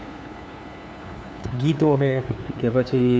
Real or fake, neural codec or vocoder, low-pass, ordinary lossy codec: fake; codec, 16 kHz, 2 kbps, FunCodec, trained on LibriTTS, 25 frames a second; none; none